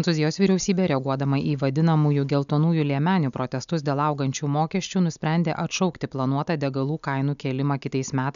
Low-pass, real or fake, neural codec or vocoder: 7.2 kHz; real; none